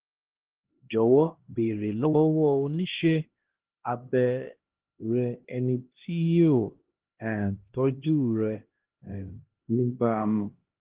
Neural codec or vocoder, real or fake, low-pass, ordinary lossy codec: codec, 16 kHz, 1 kbps, X-Codec, HuBERT features, trained on LibriSpeech; fake; 3.6 kHz; Opus, 16 kbps